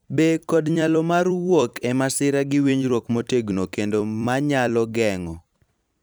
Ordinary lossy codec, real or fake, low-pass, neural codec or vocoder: none; fake; none; vocoder, 44.1 kHz, 128 mel bands every 256 samples, BigVGAN v2